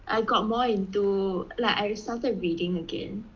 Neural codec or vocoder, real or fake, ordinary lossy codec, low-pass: none; real; Opus, 24 kbps; 7.2 kHz